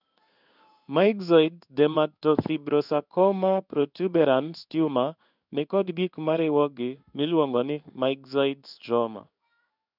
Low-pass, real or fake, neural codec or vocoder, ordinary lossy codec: 5.4 kHz; fake; codec, 16 kHz in and 24 kHz out, 1 kbps, XY-Tokenizer; none